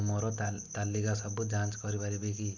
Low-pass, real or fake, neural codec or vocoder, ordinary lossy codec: 7.2 kHz; real; none; none